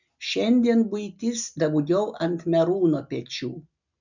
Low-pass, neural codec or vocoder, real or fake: 7.2 kHz; none; real